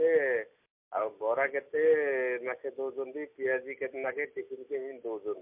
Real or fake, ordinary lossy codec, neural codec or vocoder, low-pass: real; MP3, 32 kbps; none; 3.6 kHz